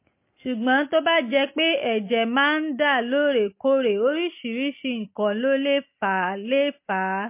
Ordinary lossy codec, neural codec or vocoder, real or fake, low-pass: MP3, 24 kbps; none; real; 3.6 kHz